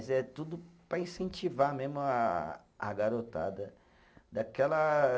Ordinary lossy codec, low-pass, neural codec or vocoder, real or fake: none; none; none; real